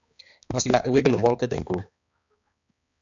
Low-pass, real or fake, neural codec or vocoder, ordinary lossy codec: 7.2 kHz; fake; codec, 16 kHz, 2 kbps, X-Codec, HuBERT features, trained on balanced general audio; AAC, 64 kbps